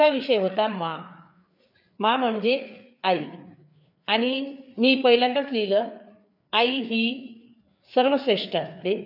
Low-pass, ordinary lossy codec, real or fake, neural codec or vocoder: 5.4 kHz; none; fake; codec, 16 kHz, 4 kbps, FreqCodec, larger model